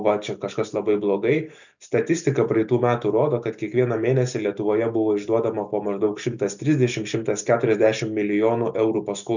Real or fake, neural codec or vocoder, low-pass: real; none; 7.2 kHz